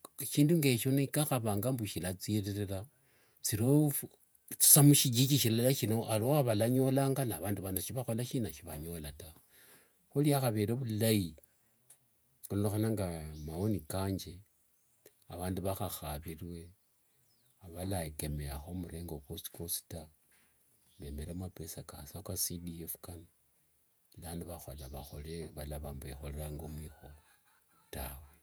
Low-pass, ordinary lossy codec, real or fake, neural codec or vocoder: none; none; fake; vocoder, 48 kHz, 128 mel bands, Vocos